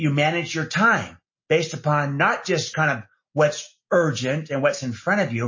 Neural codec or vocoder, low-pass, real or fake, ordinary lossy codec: none; 7.2 kHz; real; MP3, 32 kbps